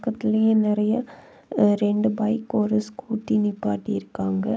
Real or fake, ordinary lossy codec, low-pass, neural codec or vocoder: real; none; none; none